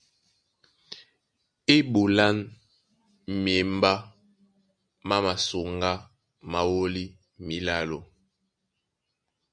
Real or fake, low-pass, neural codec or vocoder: real; 9.9 kHz; none